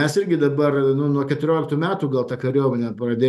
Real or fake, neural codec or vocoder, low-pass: real; none; 14.4 kHz